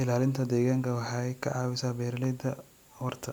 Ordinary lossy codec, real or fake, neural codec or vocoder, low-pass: none; real; none; none